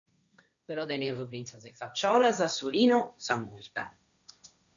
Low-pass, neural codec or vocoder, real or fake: 7.2 kHz; codec, 16 kHz, 1.1 kbps, Voila-Tokenizer; fake